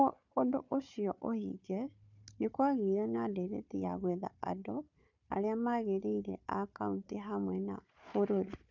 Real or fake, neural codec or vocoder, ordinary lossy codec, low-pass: fake; codec, 16 kHz, 4 kbps, FunCodec, trained on Chinese and English, 50 frames a second; none; 7.2 kHz